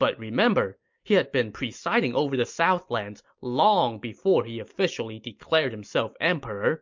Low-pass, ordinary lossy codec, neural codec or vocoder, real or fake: 7.2 kHz; MP3, 48 kbps; none; real